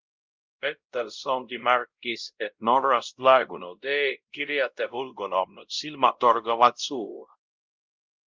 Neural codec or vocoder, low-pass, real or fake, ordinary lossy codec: codec, 16 kHz, 0.5 kbps, X-Codec, WavLM features, trained on Multilingual LibriSpeech; 7.2 kHz; fake; Opus, 24 kbps